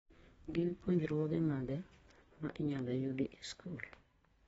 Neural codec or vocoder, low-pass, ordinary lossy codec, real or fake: codec, 32 kHz, 1.9 kbps, SNAC; 14.4 kHz; AAC, 24 kbps; fake